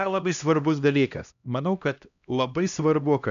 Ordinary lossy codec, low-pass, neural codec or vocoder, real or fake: MP3, 96 kbps; 7.2 kHz; codec, 16 kHz, 1 kbps, X-Codec, HuBERT features, trained on LibriSpeech; fake